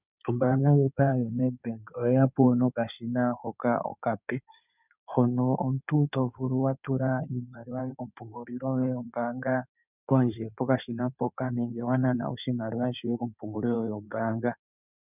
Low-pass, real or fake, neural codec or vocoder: 3.6 kHz; fake; codec, 16 kHz in and 24 kHz out, 2.2 kbps, FireRedTTS-2 codec